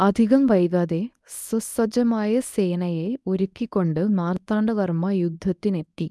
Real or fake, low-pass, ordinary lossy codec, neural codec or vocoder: fake; none; none; codec, 24 kHz, 0.9 kbps, WavTokenizer, medium speech release version 1